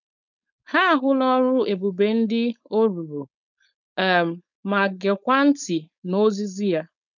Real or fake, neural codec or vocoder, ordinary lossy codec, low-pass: fake; codec, 16 kHz, 4.8 kbps, FACodec; none; 7.2 kHz